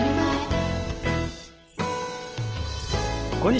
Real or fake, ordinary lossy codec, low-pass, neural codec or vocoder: real; Opus, 16 kbps; 7.2 kHz; none